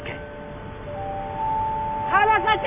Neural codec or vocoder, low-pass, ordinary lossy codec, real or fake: none; 3.6 kHz; AAC, 32 kbps; real